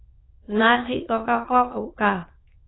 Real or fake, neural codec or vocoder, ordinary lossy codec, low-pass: fake; autoencoder, 22.05 kHz, a latent of 192 numbers a frame, VITS, trained on many speakers; AAC, 16 kbps; 7.2 kHz